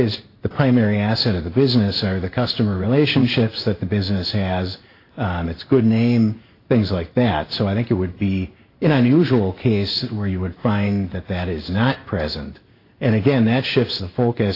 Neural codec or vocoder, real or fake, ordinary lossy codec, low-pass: codec, 16 kHz in and 24 kHz out, 1 kbps, XY-Tokenizer; fake; AAC, 32 kbps; 5.4 kHz